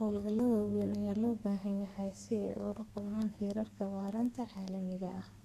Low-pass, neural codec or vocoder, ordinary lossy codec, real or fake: 14.4 kHz; codec, 32 kHz, 1.9 kbps, SNAC; none; fake